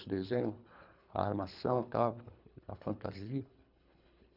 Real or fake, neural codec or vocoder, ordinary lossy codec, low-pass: fake; codec, 24 kHz, 3 kbps, HILCodec; none; 5.4 kHz